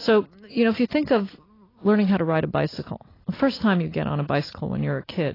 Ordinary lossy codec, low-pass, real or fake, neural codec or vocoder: AAC, 24 kbps; 5.4 kHz; real; none